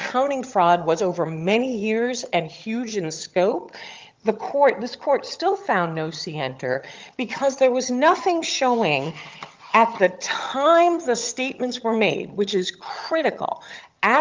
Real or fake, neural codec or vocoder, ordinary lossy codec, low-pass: fake; vocoder, 22.05 kHz, 80 mel bands, HiFi-GAN; Opus, 32 kbps; 7.2 kHz